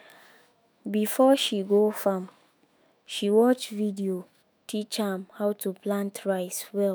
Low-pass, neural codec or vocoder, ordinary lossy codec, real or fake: none; autoencoder, 48 kHz, 128 numbers a frame, DAC-VAE, trained on Japanese speech; none; fake